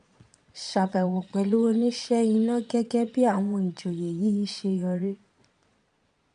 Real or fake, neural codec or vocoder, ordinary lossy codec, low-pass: fake; vocoder, 22.05 kHz, 80 mel bands, Vocos; none; 9.9 kHz